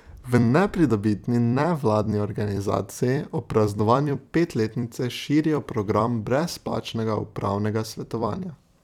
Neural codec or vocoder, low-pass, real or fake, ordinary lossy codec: vocoder, 44.1 kHz, 128 mel bands every 256 samples, BigVGAN v2; 19.8 kHz; fake; none